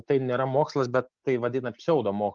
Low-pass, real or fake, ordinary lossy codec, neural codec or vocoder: 7.2 kHz; real; Opus, 32 kbps; none